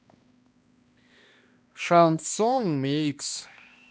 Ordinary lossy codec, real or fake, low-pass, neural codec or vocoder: none; fake; none; codec, 16 kHz, 1 kbps, X-Codec, HuBERT features, trained on balanced general audio